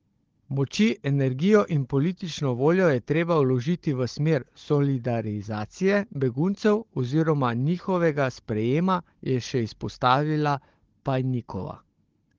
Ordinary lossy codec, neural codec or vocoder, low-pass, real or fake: Opus, 16 kbps; codec, 16 kHz, 16 kbps, FunCodec, trained on Chinese and English, 50 frames a second; 7.2 kHz; fake